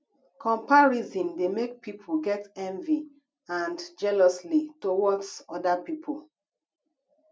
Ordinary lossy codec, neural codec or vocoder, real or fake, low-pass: none; none; real; none